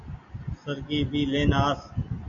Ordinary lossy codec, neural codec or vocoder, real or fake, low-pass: MP3, 48 kbps; none; real; 7.2 kHz